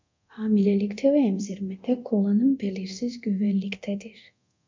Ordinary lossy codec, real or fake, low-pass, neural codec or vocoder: MP3, 64 kbps; fake; 7.2 kHz; codec, 24 kHz, 0.9 kbps, DualCodec